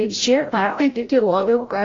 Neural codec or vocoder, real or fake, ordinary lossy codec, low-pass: codec, 16 kHz, 0.5 kbps, FreqCodec, larger model; fake; AAC, 32 kbps; 7.2 kHz